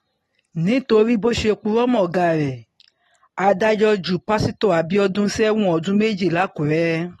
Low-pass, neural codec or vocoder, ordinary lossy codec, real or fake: 19.8 kHz; none; AAC, 32 kbps; real